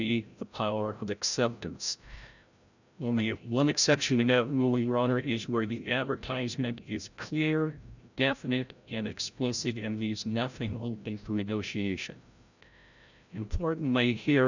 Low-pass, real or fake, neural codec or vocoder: 7.2 kHz; fake; codec, 16 kHz, 0.5 kbps, FreqCodec, larger model